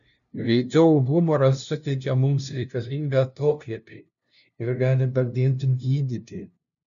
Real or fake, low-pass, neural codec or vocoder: fake; 7.2 kHz; codec, 16 kHz, 0.5 kbps, FunCodec, trained on LibriTTS, 25 frames a second